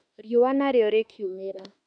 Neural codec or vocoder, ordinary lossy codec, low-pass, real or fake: autoencoder, 48 kHz, 32 numbers a frame, DAC-VAE, trained on Japanese speech; none; 9.9 kHz; fake